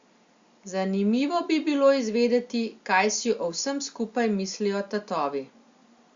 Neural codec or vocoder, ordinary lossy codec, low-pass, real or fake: none; Opus, 64 kbps; 7.2 kHz; real